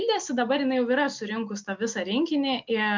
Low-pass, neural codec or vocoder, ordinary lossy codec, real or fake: 7.2 kHz; none; MP3, 64 kbps; real